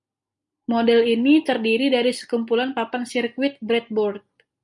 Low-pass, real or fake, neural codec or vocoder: 10.8 kHz; real; none